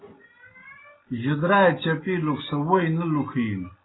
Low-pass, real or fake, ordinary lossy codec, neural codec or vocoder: 7.2 kHz; real; AAC, 16 kbps; none